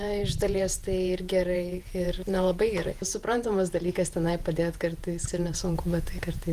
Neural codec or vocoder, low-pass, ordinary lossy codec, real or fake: vocoder, 48 kHz, 128 mel bands, Vocos; 14.4 kHz; Opus, 24 kbps; fake